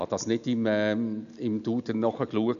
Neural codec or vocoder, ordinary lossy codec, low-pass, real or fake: none; none; 7.2 kHz; real